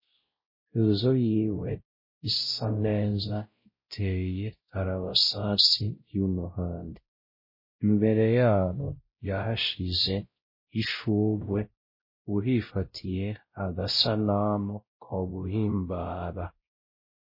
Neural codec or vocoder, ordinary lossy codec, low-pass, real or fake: codec, 16 kHz, 0.5 kbps, X-Codec, WavLM features, trained on Multilingual LibriSpeech; MP3, 24 kbps; 5.4 kHz; fake